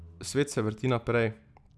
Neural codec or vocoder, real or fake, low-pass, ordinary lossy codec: none; real; none; none